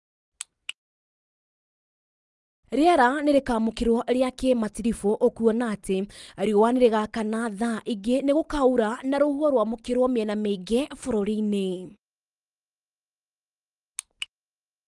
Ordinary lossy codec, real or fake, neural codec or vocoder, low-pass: Opus, 24 kbps; real; none; 10.8 kHz